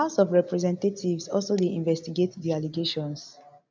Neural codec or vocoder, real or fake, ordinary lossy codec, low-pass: none; real; none; none